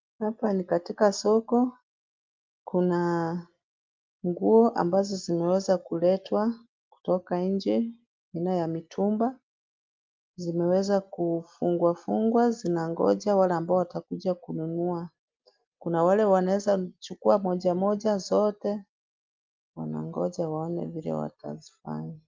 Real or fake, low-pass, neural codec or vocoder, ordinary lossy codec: real; 7.2 kHz; none; Opus, 24 kbps